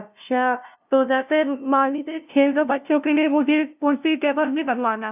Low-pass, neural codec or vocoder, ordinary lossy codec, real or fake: 3.6 kHz; codec, 16 kHz, 0.5 kbps, FunCodec, trained on LibriTTS, 25 frames a second; none; fake